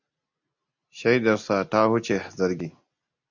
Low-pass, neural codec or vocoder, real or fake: 7.2 kHz; none; real